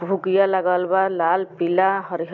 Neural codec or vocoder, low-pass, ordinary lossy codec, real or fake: none; 7.2 kHz; none; real